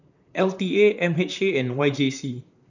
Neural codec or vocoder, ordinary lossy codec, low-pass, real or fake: vocoder, 44.1 kHz, 128 mel bands, Pupu-Vocoder; none; 7.2 kHz; fake